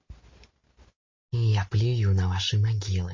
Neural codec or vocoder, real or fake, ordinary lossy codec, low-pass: none; real; MP3, 32 kbps; 7.2 kHz